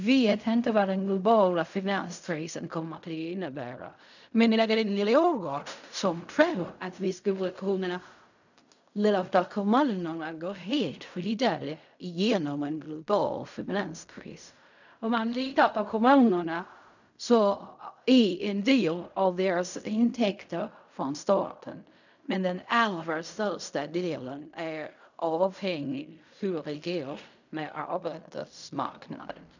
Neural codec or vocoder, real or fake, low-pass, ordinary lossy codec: codec, 16 kHz in and 24 kHz out, 0.4 kbps, LongCat-Audio-Codec, fine tuned four codebook decoder; fake; 7.2 kHz; none